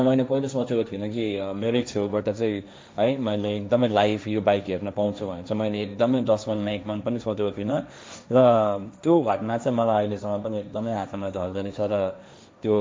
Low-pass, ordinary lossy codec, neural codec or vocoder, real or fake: none; none; codec, 16 kHz, 1.1 kbps, Voila-Tokenizer; fake